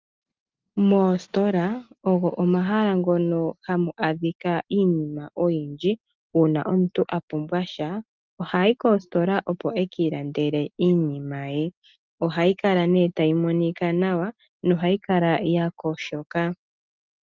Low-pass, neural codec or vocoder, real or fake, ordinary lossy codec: 7.2 kHz; none; real; Opus, 24 kbps